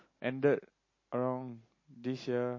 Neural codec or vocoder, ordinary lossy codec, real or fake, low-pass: none; MP3, 32 kbps; real; 7.2 kHz